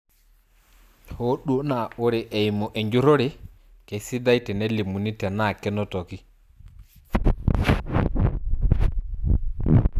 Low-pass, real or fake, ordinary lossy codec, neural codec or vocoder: 14.4 kHz; real; none; none